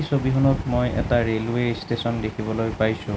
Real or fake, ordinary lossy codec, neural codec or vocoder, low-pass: real; none; none; none